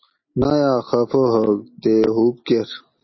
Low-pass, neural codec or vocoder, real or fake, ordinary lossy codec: 7.2 kHz; none; real; MP3, 24 kbps